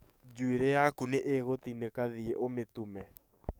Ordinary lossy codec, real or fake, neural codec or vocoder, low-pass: none; fake; codec, 44.1 kHz, 7.8 kbps, DAC; none